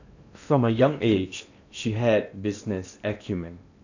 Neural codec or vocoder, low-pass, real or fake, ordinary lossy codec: codec, 16 kHz in and 24 kHz out, 0.8 kbps, FocalCodec, streaming, 65536 codes; 7.2 kHz; fake; none